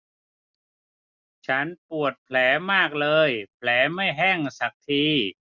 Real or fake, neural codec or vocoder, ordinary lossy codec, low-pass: real; none; none; 7.2 kHz